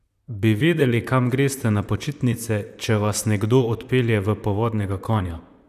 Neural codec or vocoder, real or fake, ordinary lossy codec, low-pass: vocoder, 44.1 kHz, 128 mel bands, Pupu-Vocoder; fake; none; 14.4 kHz